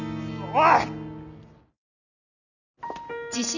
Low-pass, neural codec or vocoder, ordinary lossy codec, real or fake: 7.2 kHz; none; none; real